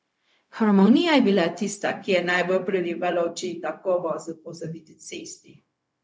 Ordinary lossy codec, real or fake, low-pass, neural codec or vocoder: none; fake; none; codec, 16 kHz, 0.4 kbps, LongCat-Audio-Codec